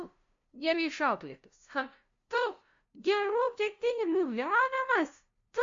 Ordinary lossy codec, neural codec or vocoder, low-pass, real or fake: MP3, 48 kbps; codec, 16 kHz, 0.5 kbps, FunCodec, trained on LibriTTS, 25 frames a second; 7.2 kHz; fake